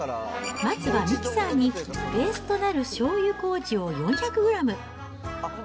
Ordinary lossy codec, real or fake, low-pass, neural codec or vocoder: none; real; none; none